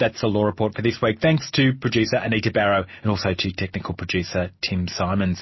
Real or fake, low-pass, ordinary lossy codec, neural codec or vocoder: real; 7.2 kHz; MP3, 24 kbps; none